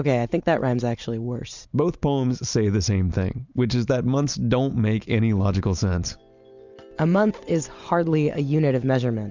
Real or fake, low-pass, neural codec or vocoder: real; 7.2 kHz; none